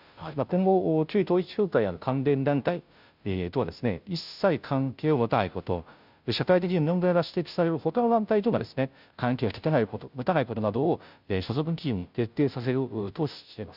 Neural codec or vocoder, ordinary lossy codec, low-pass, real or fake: codec, 16 kHz, 0.5 kbps, FunCodec, trained on Chinese and English, 25 frames a second; none; 5.4 kHz; fake